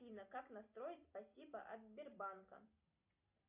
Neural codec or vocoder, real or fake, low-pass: none; real; 3.6 kHz